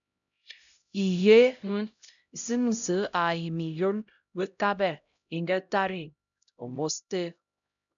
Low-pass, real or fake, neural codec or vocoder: 7.2 kHz; fake; codec, 16 kHz, 0.5 kbps, X-Codec, HuBERT features, trained on LibriSpeech